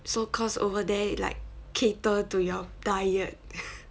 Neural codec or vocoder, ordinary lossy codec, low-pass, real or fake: none; none; none; real